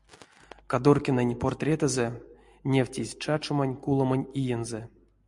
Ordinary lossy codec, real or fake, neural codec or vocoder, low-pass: MP3, 64 kbps; real; none; 10.8 kHz